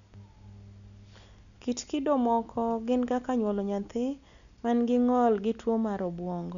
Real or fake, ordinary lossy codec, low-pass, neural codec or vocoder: real; none; 7.2 kHz; none